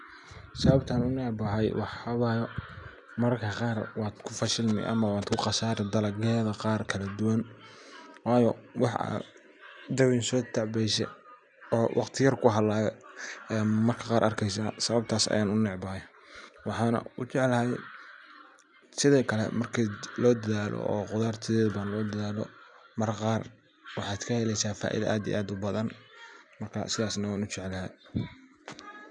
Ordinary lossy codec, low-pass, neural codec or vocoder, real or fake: none; 10.8 kHz; none; real